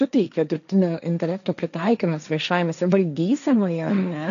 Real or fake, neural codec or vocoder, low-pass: fake; codec, 16 kHz, 1.1 kbps, Voila-Tokenizer; 7.2 kHz